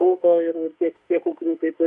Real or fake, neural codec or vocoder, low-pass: fake; autoencoder, 48 kHz, 32 numbers a frame, DAC-VAE, trained on Japanese speech; 10.8 kHz